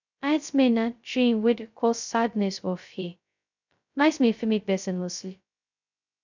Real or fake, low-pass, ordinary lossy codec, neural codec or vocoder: fake; 7.2 kHz; none; codec, 16 kHz, 0.2 kbps, FocalCodec